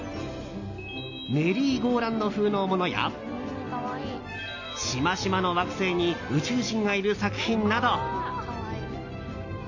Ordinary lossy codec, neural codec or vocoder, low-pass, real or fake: AAC, 48 kbps; none; 7.2 kHz; real